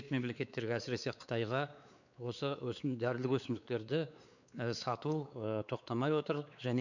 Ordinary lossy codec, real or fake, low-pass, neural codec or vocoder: none; fake; 7.2 kHz; codec, 16 kHz, 4 kbps, X-Codec, WavLM features, trained on Multilingual LibriSpeech